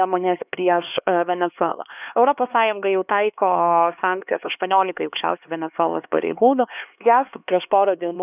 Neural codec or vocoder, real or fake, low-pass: codec, 16 kHz, 2 kbps, X-Codec, HuBERT features, trained on LibriSpeech; fake; 3.6 kHz